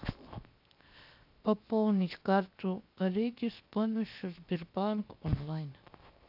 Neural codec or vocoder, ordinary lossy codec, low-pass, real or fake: codec, 16 kHz, 0.7 kbps, FocalCodec; AAC, 48 kbps; 5.4 kHz; fake